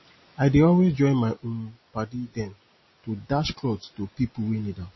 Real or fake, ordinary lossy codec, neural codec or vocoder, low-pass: real; MP3, 24 kbps; none; 7.2 kHz